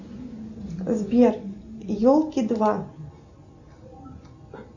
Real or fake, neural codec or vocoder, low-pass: real; none; 7.2 kHz